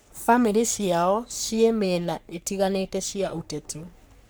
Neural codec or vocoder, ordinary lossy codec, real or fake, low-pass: codec, 44.1 kHz, 3.4 kbps, Pupu-Codec; none; fake; none